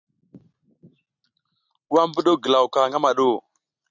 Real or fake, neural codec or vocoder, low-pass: real; none; 7.2 kHz